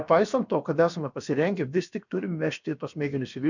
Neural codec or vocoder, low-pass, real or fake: codec, 16 kHz, about 1 kbps, DyCAST, with the encoder's durations; 7.2 kHz; fake